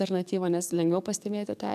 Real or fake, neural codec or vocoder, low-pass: fake; codec, 44.1 kHz, 7.8 kbps, DAC; 14.4 kHz